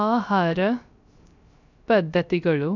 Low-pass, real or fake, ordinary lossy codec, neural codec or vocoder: 7.2 kHz; fake; none; codec, 16 kHz, 0.3 kbps, FocalCodec